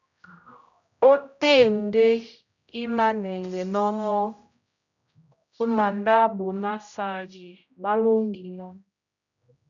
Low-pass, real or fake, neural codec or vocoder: 7.2 kHz; fake; codec, 16 kHz, 0.5 kbps, X-Codec, HuBERT features, trained on general audio